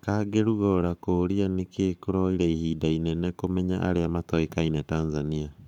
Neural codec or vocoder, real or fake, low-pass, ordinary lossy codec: codec, 44.1 kHz, 7.8 kbps, Pupu-Codec; fake; 19.8 kHz; none